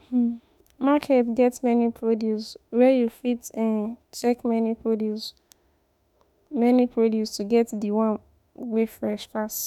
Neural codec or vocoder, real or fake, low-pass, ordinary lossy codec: autoencoder, 48 kHz, 32 numbers a frame, DAC-VAE, trained on Japanese speech; fake; 19.8 kHz; none